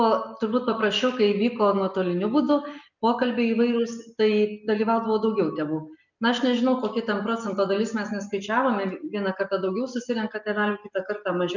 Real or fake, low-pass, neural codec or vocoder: real; 7.2 kHz; none